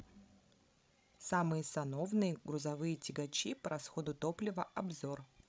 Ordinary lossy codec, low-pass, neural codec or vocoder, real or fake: none; none; codec, 16 kHz, 16 kbps, FreqCodec, larger model; fake